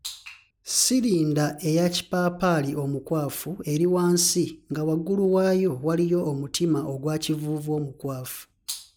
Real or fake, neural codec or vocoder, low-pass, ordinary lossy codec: real; none; none; none